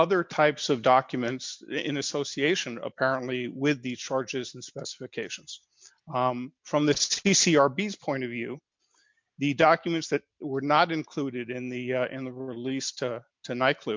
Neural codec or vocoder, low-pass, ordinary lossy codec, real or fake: none; 7.2 kHz; MP3, 64 kbps; real